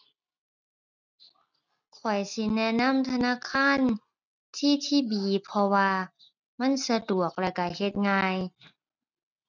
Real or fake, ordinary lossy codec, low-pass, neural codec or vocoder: real; none; 7.2 kHz; none